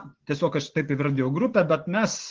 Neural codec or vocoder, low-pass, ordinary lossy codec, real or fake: none; 7.2 kHz; Opus, 16 kbps; real